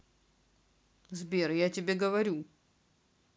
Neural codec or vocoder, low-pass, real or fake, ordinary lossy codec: none; none; real; none